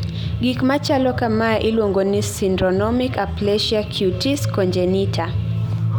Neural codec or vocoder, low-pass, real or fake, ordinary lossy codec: none; none; real; none